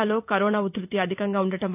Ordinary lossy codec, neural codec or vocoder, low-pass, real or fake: none; vocoder, 44.1 kHz, 128 mel bands every 256 samples, BigVGAN v2; 3.6 kHz; fake